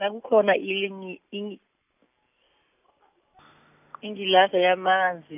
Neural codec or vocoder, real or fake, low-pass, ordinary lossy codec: none; real; 3.6 kHz; none